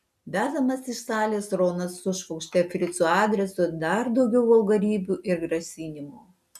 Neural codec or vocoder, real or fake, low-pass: none; real; 14.4 kHz